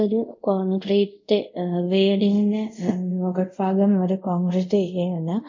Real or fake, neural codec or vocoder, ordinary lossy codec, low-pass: fake; codec, 24 kHz, 0.5 kbps, DualCodec; MP3, 64 kbps; 7.2 kHz